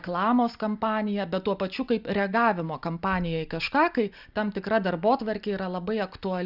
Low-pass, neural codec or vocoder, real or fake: 5.4 kHz; none; real